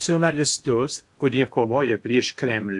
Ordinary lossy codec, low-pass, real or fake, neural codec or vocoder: AAC, 64 kbps; 10.8 kHz; fake; codec, 16 kHz in and 24 kHz out, 0.6 kbps, FocalCodec, streaming, 4096 codes